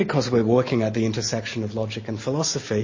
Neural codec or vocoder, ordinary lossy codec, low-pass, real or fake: none; MP3, 32 kbps; 7.2 kHz; real